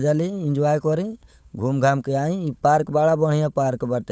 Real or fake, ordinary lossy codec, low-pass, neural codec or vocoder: fake; none; none; codec, 16 kHz, 8 kbps, FunCodec, trained on Chinese and English, 25 frames a second